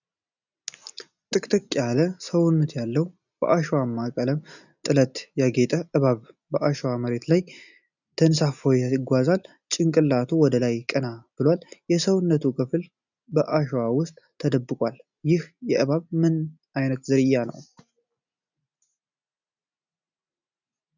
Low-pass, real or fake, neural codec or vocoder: 7.2 kHz; real; none